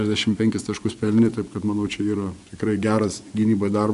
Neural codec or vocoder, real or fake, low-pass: vocoder, 24 kHz, 100 mel bands, Vocos; fake; 10.8 kHz